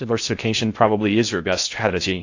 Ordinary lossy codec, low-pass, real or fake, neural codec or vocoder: AAC, 48 kbps; 7.2 kHz; fake; codec, 16 kHz in and 24 kHz out, 0.6 kbps, FocalCodec, streaming, 2048 codes